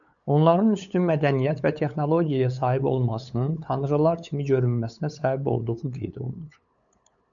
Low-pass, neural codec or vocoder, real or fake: 7.2 kHz; codec, 16 kHz, 8 kbps, FunCodec, trained on LibriTTS, 25 frames a second; fake